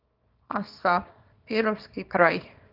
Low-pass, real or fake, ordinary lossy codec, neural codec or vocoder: 5.4 kHz; fake; Opus, 24 kbps; codec, 24 kHz, 0.9 kbps, WavTokenizer, small release